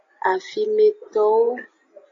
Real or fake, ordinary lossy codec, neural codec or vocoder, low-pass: real; AAC, 32 kbps; none; 7.2 kHz